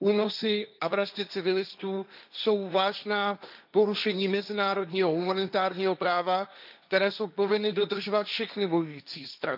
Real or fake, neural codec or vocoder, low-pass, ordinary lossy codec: fake; codec, 16 kHz, 1.1 kbps, Voila-Tokenizer; 5.4 kHz; none